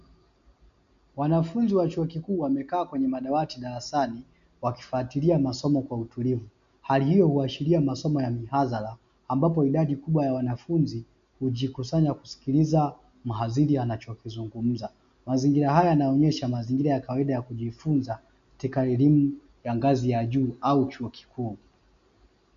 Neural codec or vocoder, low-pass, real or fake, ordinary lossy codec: none; 7.2 kHz; real; MP3, 64 kbps